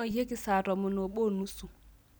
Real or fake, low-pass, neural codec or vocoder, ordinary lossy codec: real; none; none; none